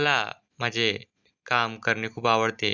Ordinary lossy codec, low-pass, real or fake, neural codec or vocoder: Opus, 64 kbps; 7.2 kHz; real; none